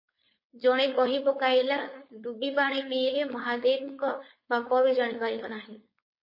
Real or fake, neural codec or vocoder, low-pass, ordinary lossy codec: fake; codec, 16 kHz, 4.8 kbps, FACodec; 5.4 kHz; MP3, 24 kbps